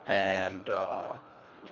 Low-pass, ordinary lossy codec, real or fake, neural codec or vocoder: 7.2 kHz; none; fake; codec, 24 kHz, 1.5 kbps, HILCodec